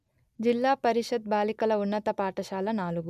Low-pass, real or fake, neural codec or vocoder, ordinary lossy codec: 14.4 kHz; real; none; none